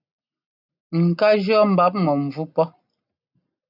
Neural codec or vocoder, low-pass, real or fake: none; 5.4 kHz; real